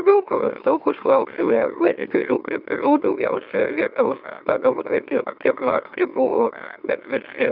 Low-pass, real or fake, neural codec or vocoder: 5.4 kHz; fake; autoencoder, 44.1 kHz, a latent of 192 numbers a frame, MeloTTS